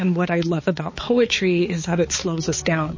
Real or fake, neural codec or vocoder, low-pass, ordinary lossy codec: fake; codec, 16 kHz, 4 kbps, X-Codec, HuBERT features, trained on balanced general audio; 7.2 kHz; MP3, 32 kbps